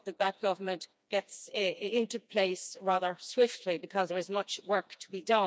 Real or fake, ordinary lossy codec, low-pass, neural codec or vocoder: fake; none; none; codec, 16 kHz, 2 kbps, FreqCodec, smaller model